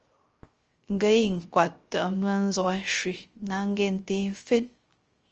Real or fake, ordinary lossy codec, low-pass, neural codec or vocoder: fake; Opus, 24 kbps; 7.2 kHz; codec, 16 kHz, 0.3 kbps, FocalCodec